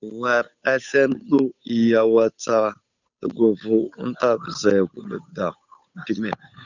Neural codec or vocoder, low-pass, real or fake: codec, 16 kHz, 8 kbps, FunCodec, trained on Chinese and English, 25 frames a second; 7.2 kHz; fake